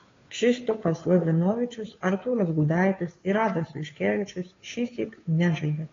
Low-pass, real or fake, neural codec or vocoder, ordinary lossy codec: 7.2 kHz; fake; codec, 16 kHz, 2 kbps, FunCodec, trained on Chinese and English, 25 frames a second; AAC, 32 kbps